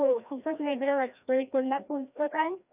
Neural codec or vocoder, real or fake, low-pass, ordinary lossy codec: codec, 16 kHz, 1 kbps, FreqCodec, larger model; fake; 3.6 kHz; none